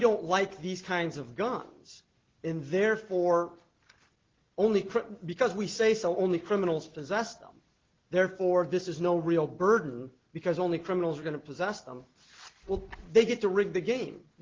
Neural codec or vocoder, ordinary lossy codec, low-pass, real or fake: none; Opus, 16 kbps; 7.2 kHz; real